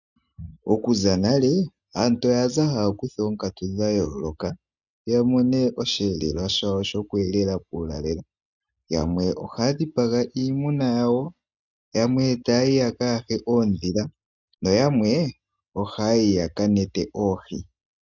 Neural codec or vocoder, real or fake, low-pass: none; real; 7.2 kHz